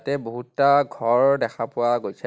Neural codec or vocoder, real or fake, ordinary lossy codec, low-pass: none; real; none; none